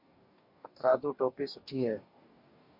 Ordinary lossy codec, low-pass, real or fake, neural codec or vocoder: MP3, 32 kbps; 5.4 kHz; fake; codec, 44.1 kHz, 2.6 kbps, DAC